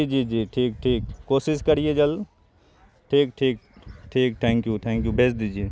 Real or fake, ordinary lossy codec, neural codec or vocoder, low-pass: real; none; none; none